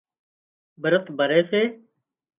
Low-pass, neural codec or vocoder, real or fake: 3.6 kHz; none; real